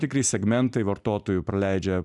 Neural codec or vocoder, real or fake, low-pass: vocoder, 44.1 kHz, 128 mel bands every 256 samples, BigVGAN v2; fake; 10.8 kHz